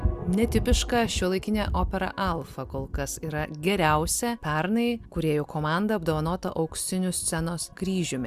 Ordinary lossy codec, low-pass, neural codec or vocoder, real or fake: Opus, 64 kbps; 14.4 kHz; none; real